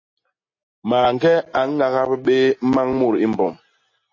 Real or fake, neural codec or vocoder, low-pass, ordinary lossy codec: real; none; 7.2 kHz; MP3, 32 kbps